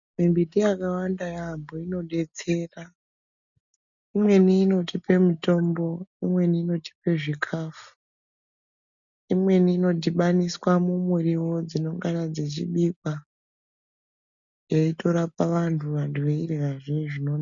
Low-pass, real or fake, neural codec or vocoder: 7.2 kHz; real; none